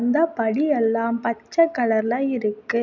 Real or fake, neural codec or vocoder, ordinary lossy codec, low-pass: real; none; none; 7.2 kHz